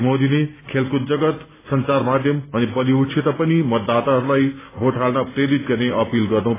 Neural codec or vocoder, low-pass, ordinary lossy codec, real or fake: none; 3.6 kHz; AAC, 16 kbps; real